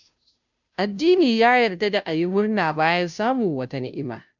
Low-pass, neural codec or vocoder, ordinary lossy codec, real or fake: 7.2 kHz; codec, 16 kHz, 0.5 kbps, FunCodec, trained on Chinese and English, 25 frames a second; none; fake